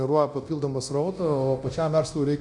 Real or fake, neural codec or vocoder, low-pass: fake; codec, 24 kHz, 0.9 kbps, DualCodec; 10.8 kHz